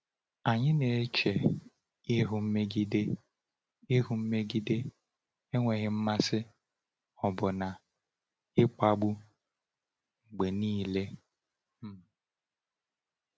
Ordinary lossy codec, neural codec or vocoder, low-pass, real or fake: none; none; none; real